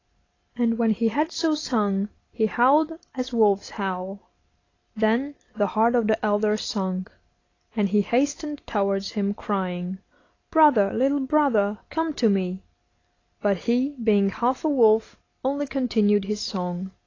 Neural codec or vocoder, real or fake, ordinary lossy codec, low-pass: none; real; AAC, 32 kbps; 7.2 kHz